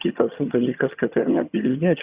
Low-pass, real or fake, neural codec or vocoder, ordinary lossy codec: 3.6 kHz; fake; vocoder, 22.05 kHz, 80 mel bands, HiFi-GAN; Opus, 64 kbps